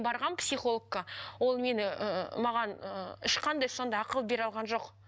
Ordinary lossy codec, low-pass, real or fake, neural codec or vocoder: none; none; real; none